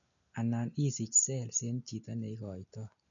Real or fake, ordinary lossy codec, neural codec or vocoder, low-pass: real; none; none; 7.2 kHz